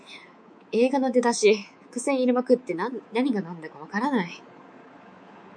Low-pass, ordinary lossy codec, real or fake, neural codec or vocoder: 9.9 kHz; MP3, 64 kbps; fake; codec, 24 kHz, 3.1 kbps, DualCodec